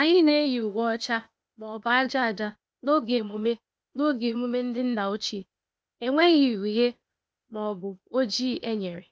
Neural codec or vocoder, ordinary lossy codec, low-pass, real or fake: codec, 16 kHz, 0.8 kbps, ZipCodec; none; none; fake